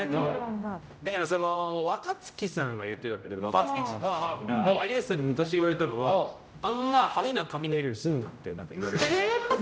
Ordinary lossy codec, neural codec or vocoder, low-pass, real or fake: none; codec, 16 kHz, 0.5 kbps, X-Codec, HuBERT features, trained on general audio; none; fake